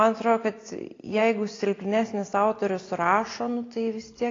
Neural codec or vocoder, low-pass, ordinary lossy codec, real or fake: none; 7.2 kHz; AAC, 32 kbps; real